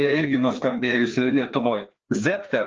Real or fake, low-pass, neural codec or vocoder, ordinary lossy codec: fake; 7.2 kHz; codec, 16 kHz, 2 kbps, FreqCodec, larger model; Opus, 24 kbps